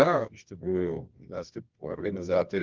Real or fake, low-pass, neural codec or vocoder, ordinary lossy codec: fake; 7.2 kHz; codec, 24 kHz, 0.9 kbps, WavTokenizer, medium music audio release; Opus, 24 kbps